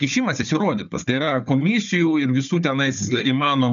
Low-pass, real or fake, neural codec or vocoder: 7.2 kHz; fake; codec, 16 kHz, 4 kbps, FunCodec, trained on Chinese and English, 50 frames a second